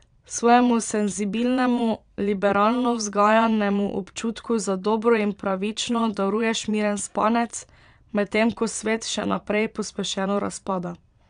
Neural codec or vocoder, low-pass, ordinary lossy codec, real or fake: vocoder, 22.05 kHz, 80 mel bands, Vocos; 9.9 kHz; none; fake